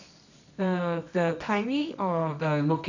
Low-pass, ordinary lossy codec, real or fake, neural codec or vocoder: 7.2 kHz; none; fake; codec, 24 kHz, 0.9 kbps, WavTokenizer, medium music audio release